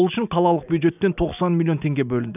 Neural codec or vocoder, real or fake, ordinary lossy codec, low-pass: none; real; none; 3.6 kHz